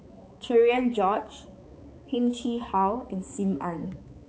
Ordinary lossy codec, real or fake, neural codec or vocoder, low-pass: none; fake; codec, 16 kHz, 4 kbps, X-Codec, HuBERT features, trained on balanced general audio; none